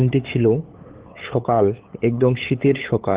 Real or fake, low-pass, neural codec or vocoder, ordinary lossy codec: fake; 3.6 kHz; codec, 16 kHz, 8 kbps, FunCodec, trained on LibriTTS, 25 frames a second; Opus, 16 kbps